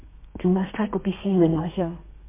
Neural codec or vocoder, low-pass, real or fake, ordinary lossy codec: codec, 32 kHz, 1.9 kbps, SNAC; 3.6 kHz; fake; MP3, 24 kbps